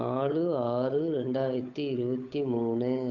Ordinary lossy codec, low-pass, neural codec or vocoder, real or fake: none; 7.2 kHz; codec, 16 kHz, 8 kbps, FunCodec, trained on Chinese and English, 25 frames a second; fake